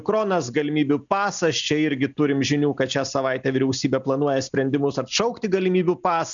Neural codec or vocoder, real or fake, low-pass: none; real; 7.2 kHz